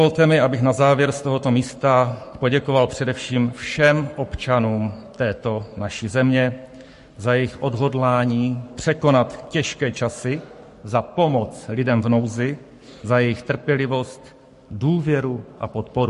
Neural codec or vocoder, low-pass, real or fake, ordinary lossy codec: codec, 44.1 kHz, 7.8 kbps, Pupu-Codec; 14.4 kHz; fake; MP3, 48 kbps